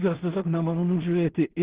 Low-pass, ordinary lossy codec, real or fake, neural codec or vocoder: 3.6 kHz; Opus, 16 kbps; fake; codec, 16 kHz in and 24 kHz out, 0.4 kbps, LongCat-Audio-Codec, two codebook decoder